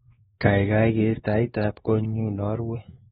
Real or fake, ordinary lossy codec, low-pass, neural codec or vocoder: fake; AAC, 16 kbps; 7.2 kHz; codec, 16 kHz, 2 kbps, X-Codec, WavLM features, trained on Multilingual LibriSpeech